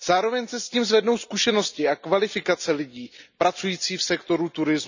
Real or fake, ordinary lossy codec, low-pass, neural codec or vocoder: real; none; 7.2 kHz; none